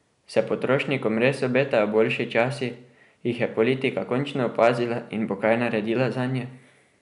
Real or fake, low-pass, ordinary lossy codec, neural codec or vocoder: real; 10.8 kHz; none; none